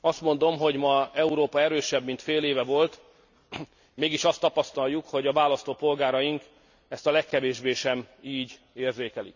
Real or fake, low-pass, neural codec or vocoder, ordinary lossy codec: real; 7.2 kHz; none; none